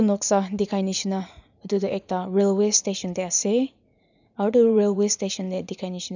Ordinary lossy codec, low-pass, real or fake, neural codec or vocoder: none; 7.2 kHz; fake; vocoder, 44.1 kHz, 80 mel bands, Vocos